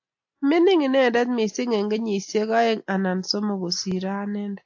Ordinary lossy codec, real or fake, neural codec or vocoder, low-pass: AAC, 48 kbps; real; none; 7.2 kHz